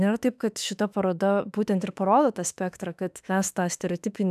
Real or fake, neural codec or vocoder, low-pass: fake; autoencoder, 48 kHz, 32 numbers a frame, DAC-VAE, trained on Japanese speech; 14.4 kHz